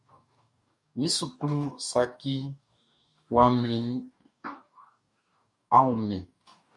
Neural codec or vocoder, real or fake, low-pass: codec, 44.1 kHz, 2.6 kbps, DAC; fake; 10.8 kHz